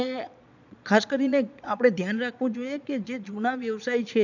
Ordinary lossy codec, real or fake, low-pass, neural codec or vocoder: none; fake; 7.2 kHz; vocoder, 22.05 kHz, 80 mel bands, Vocos